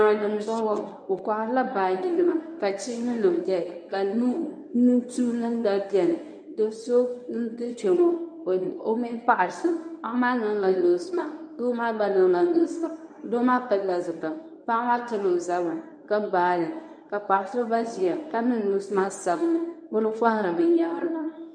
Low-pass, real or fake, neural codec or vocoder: 9.9 kHz; fake; codec, 24 kHz, 0.9 kbps, WavTokenizer, medium speech release version 1